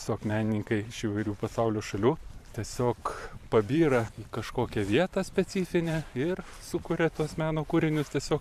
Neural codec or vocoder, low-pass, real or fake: vocoder, 44.1 kHz, 128 mel bands every 512 samples, BigVGAN v2; 14.4 kHz; fake